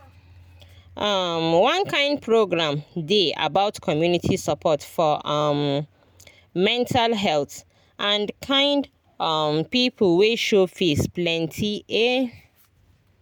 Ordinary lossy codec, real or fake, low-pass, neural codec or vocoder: none; real; none; none